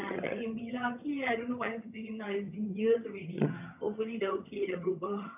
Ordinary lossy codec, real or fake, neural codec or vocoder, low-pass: none; fake; vocoder, 22.05 kHz, 80 mel bands, HiFi-GAN; 3.6 kHz